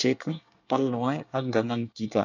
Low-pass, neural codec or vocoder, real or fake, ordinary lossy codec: 7.2 kHz; codec, 24 kHz, 1 kbps, SNAC; fake; none